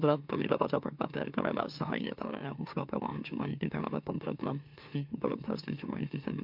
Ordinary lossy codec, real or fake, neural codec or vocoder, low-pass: MP3, 48 kbps; fake; autoencoder, 44.1 kHz, a latent of 192 numbers a frame, MeloTTS; 5.4 kHz